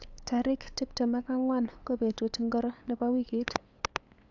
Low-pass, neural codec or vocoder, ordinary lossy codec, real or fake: 7.2 kHz; codec, 16 kHz, 8 kbps, FunCodec, trained on LibriTTS, 25 frames a second; AAC, 48 kbps; fake